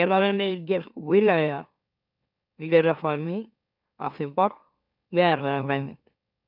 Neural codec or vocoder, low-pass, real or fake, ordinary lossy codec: autoencoder, 44.1 kHz, a latent of 192 numbers a frame, MeloTTS; 5.4 kHz; fake; none